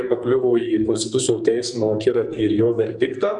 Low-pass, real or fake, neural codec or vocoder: 10.8 kHz; fake; codec, 44.1 kHz, 2.6 kbps, SNAC